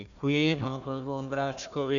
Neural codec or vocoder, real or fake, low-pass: codec, 16 kHz, 1 kbps, FunCodec, trained on Chinese and English, 50 frames a second; fake; 7.2 kHz